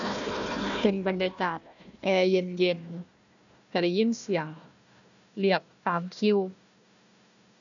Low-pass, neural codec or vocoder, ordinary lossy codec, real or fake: 7.2 kHz; codec, 16 kHz, 1 kbps, FunCodec, trained on Chinese and English, 50 frames a second; none; fake